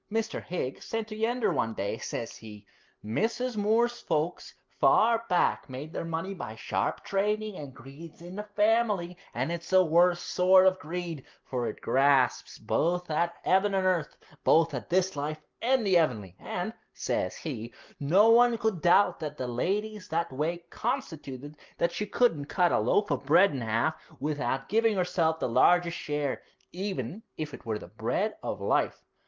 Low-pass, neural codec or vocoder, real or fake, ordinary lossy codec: 7.2 kHz; none; real; Opus, 32 kbps